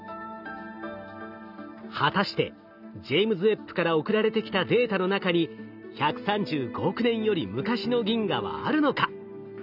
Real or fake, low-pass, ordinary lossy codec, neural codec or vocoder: real; 5.4 kHz; none; none